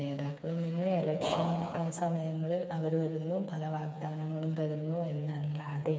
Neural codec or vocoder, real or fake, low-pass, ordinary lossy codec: codec, 16 kHz, 4 kbps, FreqCodec, smaller model; fake; none; none